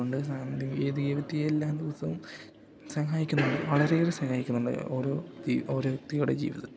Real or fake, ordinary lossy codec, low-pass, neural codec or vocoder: real; none; none; none